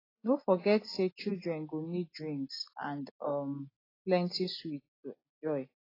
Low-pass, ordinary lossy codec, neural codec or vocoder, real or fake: 5.4 kHz; AAC, 24 kbps; none; real